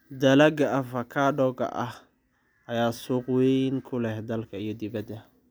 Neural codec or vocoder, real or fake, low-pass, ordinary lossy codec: none; real; none; none